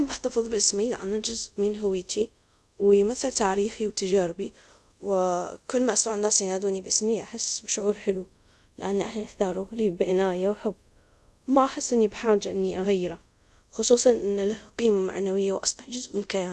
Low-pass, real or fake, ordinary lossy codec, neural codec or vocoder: none; fake; none; codec, 24 kHz, 0.5 kbps, DualCodec